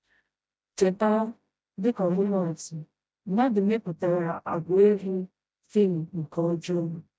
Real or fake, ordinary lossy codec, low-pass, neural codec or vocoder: fake; none; none; codec, 16 kHz, 0.5 kbps, FreqCodec, smaller model